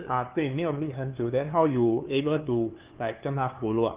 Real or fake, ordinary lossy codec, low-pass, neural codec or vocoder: fake; Opus, 32 kbps; 3.6 kHz; codec, 16 kHz, 2 kbps, FunCodec, trained on LibriTTS, 25 frames a second